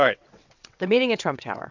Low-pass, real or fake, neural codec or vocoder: 7.2 kHz; fake; vocoder, 22.05 kHz, 80 mel bands, WaveNeXt